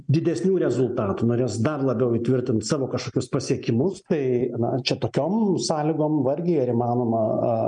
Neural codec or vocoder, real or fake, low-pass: none; real; 9.9 kHz